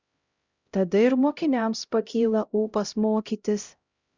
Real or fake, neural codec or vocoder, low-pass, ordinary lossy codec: fake; codec, 16 kHz, 0.5 kbps, X-Codec, HuBERT features, trained on LibriSpeech; 7.2 kHz; Opus, 64 kbps